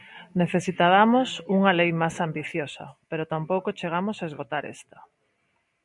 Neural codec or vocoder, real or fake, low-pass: none; real; 10.8 kHz